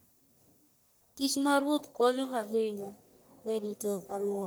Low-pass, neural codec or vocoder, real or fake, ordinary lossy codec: none; codec, 44.1 kHz, 1.7 kbps, Pupu-Codec; fake; none